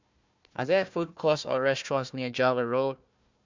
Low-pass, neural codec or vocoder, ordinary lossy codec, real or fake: 7.2 kHz; codec, 16 kHz, 1 kbps, FunCodec, trained on Chinese and English, 50 frames a second; MP3, 64 kbps; fake